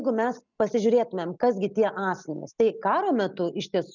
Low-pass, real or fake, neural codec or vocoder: 7.2 kHz; real; none